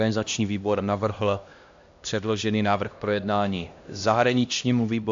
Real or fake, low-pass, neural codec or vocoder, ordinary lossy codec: fake; 7.2 kHz; codec, 16 kHz, 1 kbps, X-Codec, HuBERT features, trained on LibriSpeech; AAC, 64 kbps